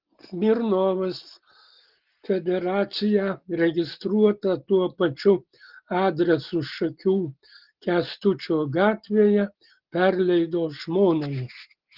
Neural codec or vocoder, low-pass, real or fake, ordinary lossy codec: none; 5.4 kHz; real; Opus, 16 kbps